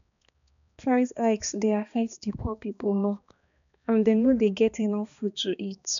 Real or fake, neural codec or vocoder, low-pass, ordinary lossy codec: fake; codec, 16 kHz, 2 kbps, X-Codec, HuBERT features, trained on balanced general audio; 7.2 kHz; none